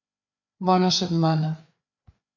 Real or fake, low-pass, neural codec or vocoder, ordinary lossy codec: fake; 7.2 kHz; codec, 16 kHz, 4 kbps, FreqCodec, larger model; MP3, 64 kbps